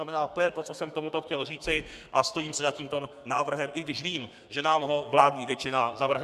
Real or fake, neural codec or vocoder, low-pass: fake; codec, 32 kHz, 1.9 kbps, SNAC; 14.4 kHz